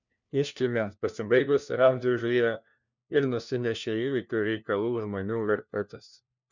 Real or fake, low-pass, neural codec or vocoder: fake; 7.2 kHz; codec, 16 kHz, 1 kbps, FunCodec, trained on LibriTTS, 50 frames a second